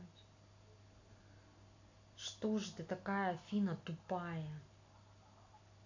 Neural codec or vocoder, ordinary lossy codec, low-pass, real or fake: none; AAC, 48 kbps; 7.2 kHz; real